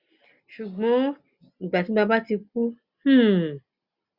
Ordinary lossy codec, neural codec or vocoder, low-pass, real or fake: Opus, 64 kbps; none; 5.4 kHz; real